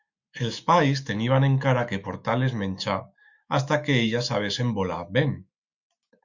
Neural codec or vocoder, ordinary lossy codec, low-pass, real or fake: autoencoder, 48 kHz, 128 numbers a frame, DAC-VAE, trained on Japanese speech; Opus, 64 kbps; 7.2 kHz; fake